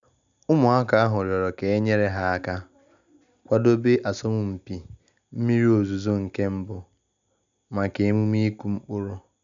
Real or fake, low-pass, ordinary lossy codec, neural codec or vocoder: real; 7.2 kHz; none; none